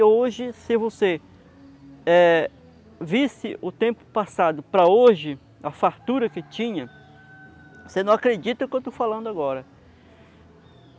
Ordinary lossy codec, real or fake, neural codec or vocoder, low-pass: none; real; none; none